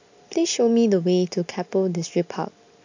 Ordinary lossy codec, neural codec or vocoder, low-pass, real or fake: AAC, 48 kbps; none; 7.2 kHz; real